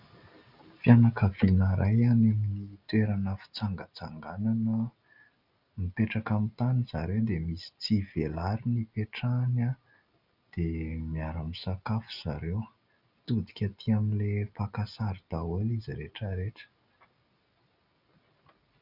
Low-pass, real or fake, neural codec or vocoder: 5.4 kHz; real; none